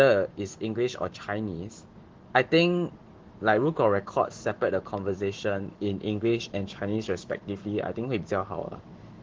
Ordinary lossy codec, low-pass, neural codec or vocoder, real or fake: Opus, 16 kbps; 7.2 kHz; autoencoder, 48 kHz, 128 numbers a frame, DAC-VAE, trained on Japanese speech; fake